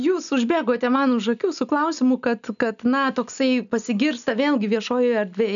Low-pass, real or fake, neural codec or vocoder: 7.2 kHz; real; none